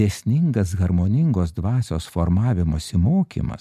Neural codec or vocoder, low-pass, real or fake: none; 14.4 kHz; real